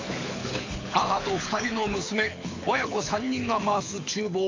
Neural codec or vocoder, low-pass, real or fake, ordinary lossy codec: codec, 24 kHz, 6 kbps, HILCodec; 7.2 kHz; fake; AAC, 48 kbps